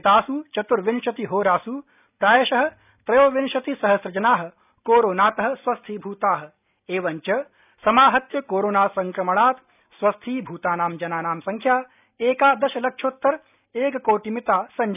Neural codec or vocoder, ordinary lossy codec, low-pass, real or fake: none; none; 3.6 kHz; real